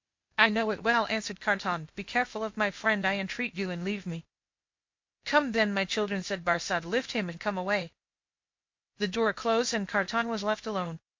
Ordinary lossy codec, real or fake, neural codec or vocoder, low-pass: MP3, 48 kbps; fake; codec, 16 kHz, 0.8 kbps, ZipCodec; 7.2 kHz